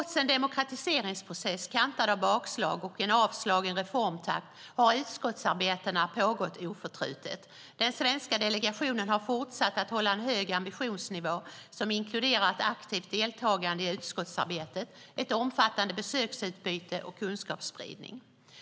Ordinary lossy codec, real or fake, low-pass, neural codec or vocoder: none; real; none; none